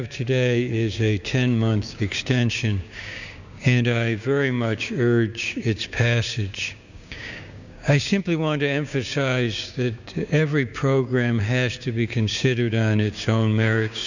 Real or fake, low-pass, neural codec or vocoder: fake; 7.2 kHz; codec, 16 kHz, 6 kbps, DAC